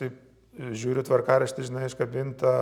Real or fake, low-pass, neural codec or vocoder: real; 19.8 kHz; none